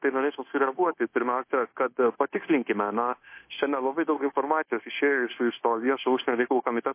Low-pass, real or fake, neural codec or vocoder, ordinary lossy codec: 3.6 kHz; fake; codec, 16 kHz, 0.9 kbps, LongCat-Audio-Codec; MP3, 24 kbps